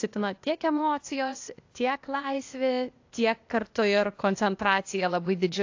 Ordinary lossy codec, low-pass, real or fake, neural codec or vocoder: AAC, 48 kbps; 7.2 kHz; fake; codec, 16 kHz, 0.8 kbps, ZipCodec